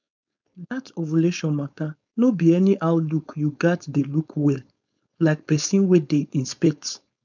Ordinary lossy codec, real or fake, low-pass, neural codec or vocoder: none; fake; 7.2 kHz; codec, 16 kHz, 4.8 kbps, FACodec